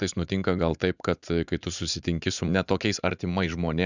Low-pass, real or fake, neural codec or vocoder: 7.2 kHz; fake; vocoder, 44.1 kHz, 80 mel bands, Vocos